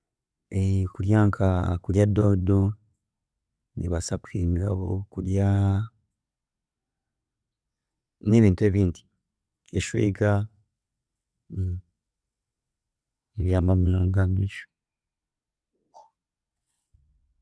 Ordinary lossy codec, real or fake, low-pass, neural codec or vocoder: none; fake; none; vocoder, 22.05 kHz, 80 mel bands, WaveNeXt